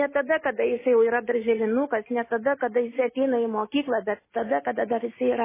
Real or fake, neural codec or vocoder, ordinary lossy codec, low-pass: real; none; MP3, 16 kbps; 3.6 kHz